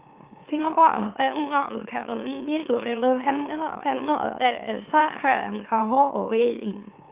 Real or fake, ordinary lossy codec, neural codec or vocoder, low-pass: fake; Opus, 24 kbps; autoencoder, 44.1 kHz, a latent of 192 numbers a frame, MeloTTS; 3.6 kHz